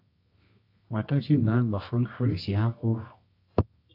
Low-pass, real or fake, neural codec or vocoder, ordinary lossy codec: 5.4 kHz; fake; codec, 24 kHz, 0.9 kbps, WavTokenizer, medium music audio release; AAC, 32 kbps